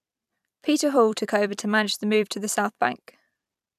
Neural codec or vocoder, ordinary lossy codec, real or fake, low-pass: vocoder, 44.1 kHz, 128 mel bands every 256 samples, BigVGAN v2; none; fake; 14.4 kHz